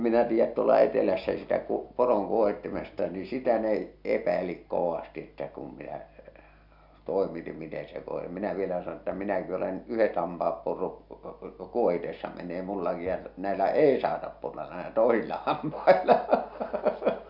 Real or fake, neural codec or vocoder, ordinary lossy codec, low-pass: real; none; none; 5.4 kHz